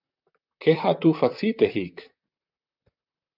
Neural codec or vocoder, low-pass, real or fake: vocoder, 44.1 kHz, 128 mel bands, Pupu-Vocoder; 5.4 kHz; fake